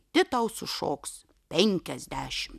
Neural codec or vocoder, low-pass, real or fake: none; 14.4 kHz; real